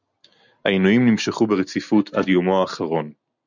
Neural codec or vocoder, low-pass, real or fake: none; 7.2 kHz; real